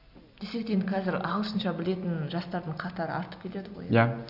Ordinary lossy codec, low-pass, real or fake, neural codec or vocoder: none; 5.4 kHz; real; none